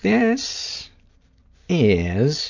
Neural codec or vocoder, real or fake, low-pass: none; real; 7.2 kHz